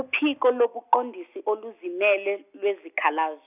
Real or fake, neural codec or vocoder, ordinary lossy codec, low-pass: real; none; none; 3.6 kHz